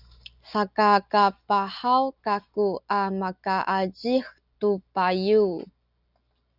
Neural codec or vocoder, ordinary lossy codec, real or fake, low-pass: none; Opus, 64 kbps; real; 5.4 kHz